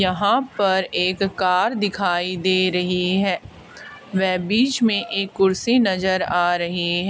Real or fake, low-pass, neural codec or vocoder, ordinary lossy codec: real; none; none; none